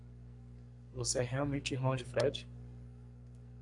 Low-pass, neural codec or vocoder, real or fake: 10.8 kHz; codec, 44.1 kHz, 2.6 kbps, SNAC; fake